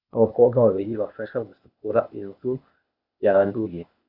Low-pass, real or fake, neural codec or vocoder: 5.4 kHz; fake; codec, 16 kHz, 0.8 kbps, ZipCodec